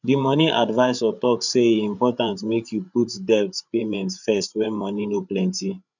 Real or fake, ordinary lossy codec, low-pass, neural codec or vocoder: fake; none; 7.2 kHz; codec, 16 kHz, 8 kbps, FreqCodec, larger model